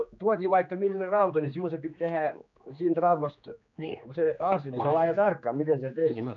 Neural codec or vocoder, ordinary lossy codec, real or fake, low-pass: codec, 16 kHz, 2 kbps, X-Codec, HuBERT features, trained on general audio; none; fake; 7.2 kHz